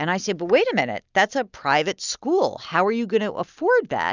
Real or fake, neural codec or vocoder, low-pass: real; none; 7.2 kHz